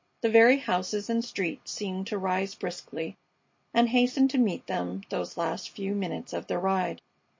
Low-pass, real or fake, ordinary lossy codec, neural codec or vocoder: 7.2 kHz; real; MP3, 32 kbps; none